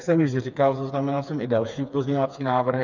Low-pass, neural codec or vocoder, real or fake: 7.2 kHz; codec, 16 kHz, 4 kbps, FreqCodec, smaller model; fake